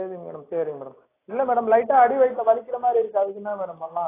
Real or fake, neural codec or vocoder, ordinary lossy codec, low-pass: real; none; AAC, 16 kbps; 3.6 kHz